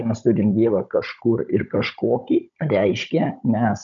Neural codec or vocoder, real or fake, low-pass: codec, 16 kHz, 16 kbps, FunCodec, trained on Chinese and English, 50 frames a second; fake; 7.2 kHz